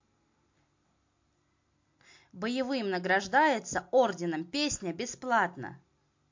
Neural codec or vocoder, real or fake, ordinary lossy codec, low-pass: none; real; MP3, 48 kbps; 7.2 kHz